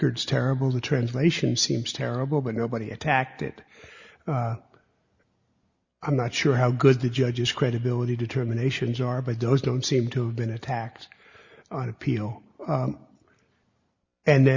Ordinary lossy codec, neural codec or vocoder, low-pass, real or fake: Opus, 64 kbps; none; 7.2 kHz; real